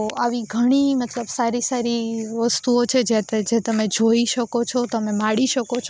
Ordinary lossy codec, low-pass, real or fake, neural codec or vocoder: none; none; real; none